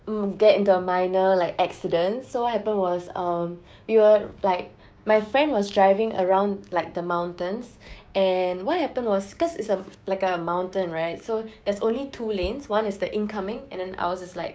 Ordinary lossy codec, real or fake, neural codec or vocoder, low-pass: none; fake; codec, 16 kHz, 6 kbps, DAC; none